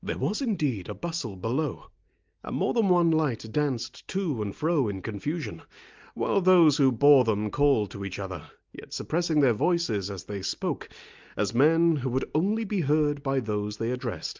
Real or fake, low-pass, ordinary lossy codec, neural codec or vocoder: real; 7.2 kHz; Opus, 24 kbps; none